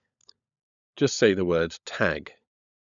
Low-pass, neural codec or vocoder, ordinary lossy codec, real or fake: 7.2 kHz; codec, 16 kHz, 16 kbps, FunCodec, trained on LibriTTS, 50 frames a second; none; fake